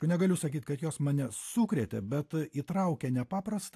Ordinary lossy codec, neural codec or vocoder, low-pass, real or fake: AAC, 64 kbps; none; 14.4 kHz; real